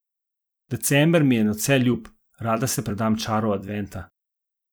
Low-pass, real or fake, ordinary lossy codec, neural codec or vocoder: none; fake; none; vocoder, 44.1 kHz, 128 mel bands every 256 samples, BigVGAN v2